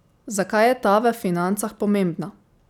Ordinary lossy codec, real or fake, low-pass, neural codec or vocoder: none; real; 19.8 kHz; none